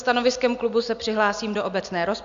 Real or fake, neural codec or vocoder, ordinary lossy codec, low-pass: real; none; MP3, 64 kbps; 7.2 kHz